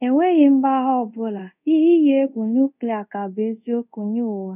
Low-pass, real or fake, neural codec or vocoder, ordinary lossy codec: 3.6 kHz; fake; codec, 24 kHz, 0.5 kbps, DualCodec; none